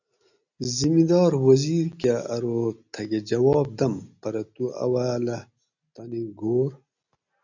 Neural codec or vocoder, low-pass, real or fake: none; 7.2 kHz; real